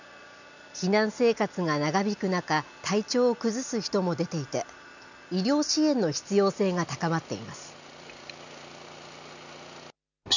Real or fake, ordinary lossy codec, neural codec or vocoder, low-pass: real; none; none; 7.2 kHz